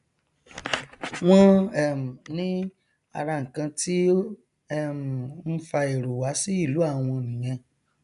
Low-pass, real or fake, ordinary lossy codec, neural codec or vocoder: 10.8 kHz; real; none; none